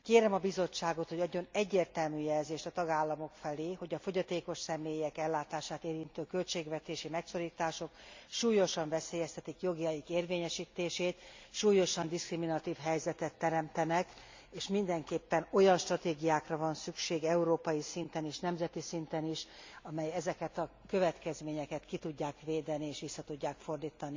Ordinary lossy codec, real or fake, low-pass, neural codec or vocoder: MP3, 64 kbps; real; 7.2 kHz; none